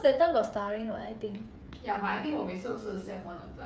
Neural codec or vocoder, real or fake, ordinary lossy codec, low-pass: codec, 16 kHz, 8 kbps, FreqCodec, smaller model; fake; none; none